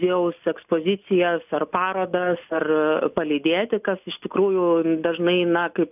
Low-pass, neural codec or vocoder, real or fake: 3.6 kHz; none; real